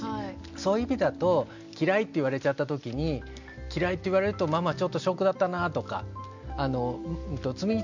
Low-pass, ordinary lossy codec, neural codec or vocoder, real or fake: 7.2 kHz; none; none; real